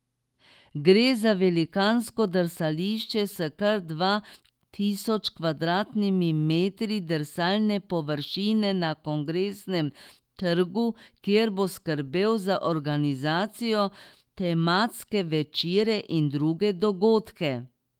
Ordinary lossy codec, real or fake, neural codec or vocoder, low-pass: Opus, 24 kbps; fake; autoencoder, 48 kHz, 128 numbers a frame, DAC-VAE, trained on Japanese speech; 19.8 kHz